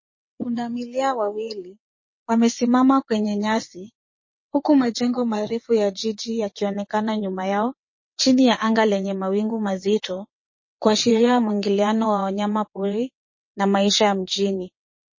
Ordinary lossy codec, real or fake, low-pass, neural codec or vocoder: MP3, 32 kbps; fake; 7.2 kHz; vocoder, 22.05 kHz, 80 mel bands, WaveNeXt